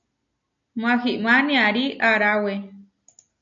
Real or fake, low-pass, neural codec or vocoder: real; 7.2 kHz; none